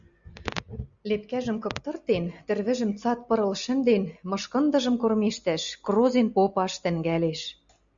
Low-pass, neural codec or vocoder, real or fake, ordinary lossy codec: 7.2 kHz; none; real; Opus, 64 kbps